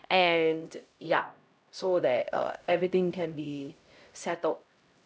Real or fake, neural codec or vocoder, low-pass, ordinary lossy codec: fake; codec, 16 kHz, 0.5 kbps, X-Codec, HuBERT features, trained on LibriSpeech; none; none